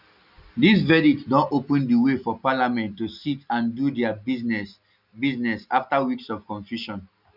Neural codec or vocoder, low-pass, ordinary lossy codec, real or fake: none; 5.4 kHz; none; real